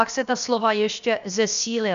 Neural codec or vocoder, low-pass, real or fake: codec, 16 kHz, about 1 kbps, DyCAST, with the encoder's durations; 7.2 kHz; fake